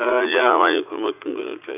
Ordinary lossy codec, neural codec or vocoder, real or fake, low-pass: none; vocoder, 44.1 kHz, 80 mel bands, Vocos; fake; 3.6 kHz